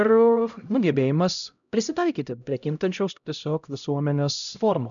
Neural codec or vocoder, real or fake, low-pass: codec, 16 kHz, 0.5 kbps, X-Codec, HuBERT features, trained on LibriSpeech; fake; 7.2 kHz